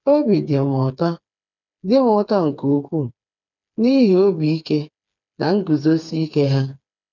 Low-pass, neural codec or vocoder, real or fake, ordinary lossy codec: 7.2 kHz; codec, 16 kHz, 4 kbps, FreqCodec, smaller model; fake; none